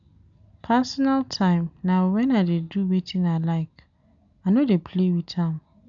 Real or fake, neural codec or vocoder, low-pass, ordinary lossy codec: real; none; 7.2 kHz; none